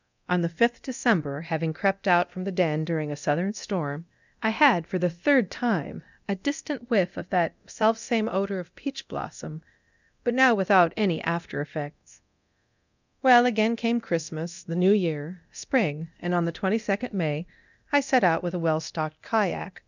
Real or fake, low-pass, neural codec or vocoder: fake; 7.2 kHz; codec, 24 kHz, 0.9 kbps, DualCodec